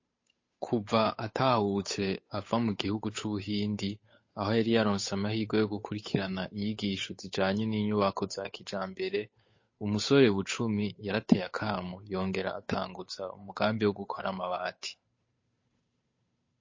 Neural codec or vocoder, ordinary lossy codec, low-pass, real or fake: codec, 16 kHz, 8 kbps, FunCodec, trained on Chinese and English, 25 frames a second; MP3, 32 kbps; 7.2 kHz; fake